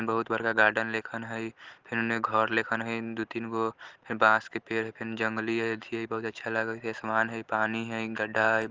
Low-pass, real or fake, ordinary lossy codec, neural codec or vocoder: 7.2 kHz; real; Opus, 16 kbps; none